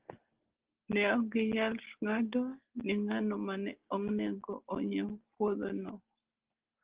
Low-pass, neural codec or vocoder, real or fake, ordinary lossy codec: 3.6 kHz; none; real; Opus, 16 kbps